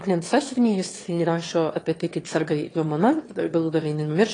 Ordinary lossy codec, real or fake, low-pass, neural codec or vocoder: AAC, 32 kbps; fake; 9.9 kHz; autoencoder, 22.05 kHz, a latent of 192 numbers a frame, VITS, trained on one speaker